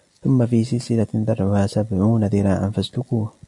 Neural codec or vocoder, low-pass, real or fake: none; 10.8 kHz; real